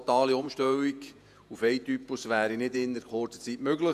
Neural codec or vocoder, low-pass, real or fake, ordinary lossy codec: vocoder, 48 kHz, 128 mel bands, Vocos; 14.4 kHz; fake; none